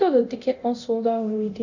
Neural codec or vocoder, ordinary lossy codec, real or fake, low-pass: codec, 24 kHz, 0.9 kbps, DualCodec; none; fake; 7.2 kHz